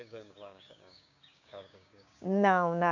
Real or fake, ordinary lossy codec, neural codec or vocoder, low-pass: fake; none; codec, 16 kHz, 6 kbps, DAC; 7.2 kHz